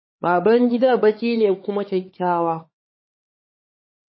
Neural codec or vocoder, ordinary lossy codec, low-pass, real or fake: codec, 16 kHz, 4 kbps, X-Codec, HuBERT features, trained on LibriSpeech; MP3, 24 kbps; 7.2 kHz; fake